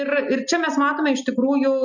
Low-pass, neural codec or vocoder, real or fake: 7.2 kHz; none; real